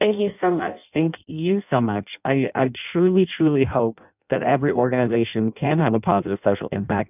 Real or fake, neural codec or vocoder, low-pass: fake; codec, 16 kHz in and 24 kHz out, 0.6 kbps, FireRedTTS-2 codec; 3.6 kHz